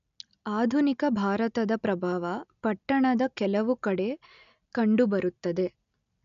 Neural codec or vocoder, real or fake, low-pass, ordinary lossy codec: none; real; 7.2 kHz; MP3, 96 kbps